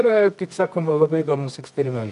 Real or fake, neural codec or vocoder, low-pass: fake; codec, 24 kHz, 0.9 kbps, WavTokenizer, medium music audio release; 10.8 kHz